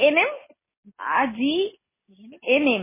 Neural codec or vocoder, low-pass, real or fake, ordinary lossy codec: none; 3.6 kHz; real; MP3, 16 kbps